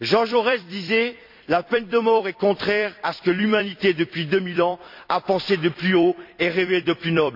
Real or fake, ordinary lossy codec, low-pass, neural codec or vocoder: fake; MP3, 48 kbps; 5.4 kHz; vocoder, 44.1 kHz, 128 mel bands every 256 samples, BigVGAN v2